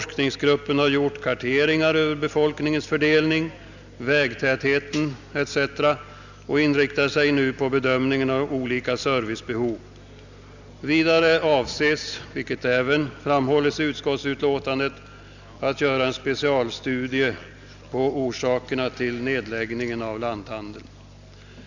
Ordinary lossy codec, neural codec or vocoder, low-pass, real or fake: none; none; 7.2 kHz; real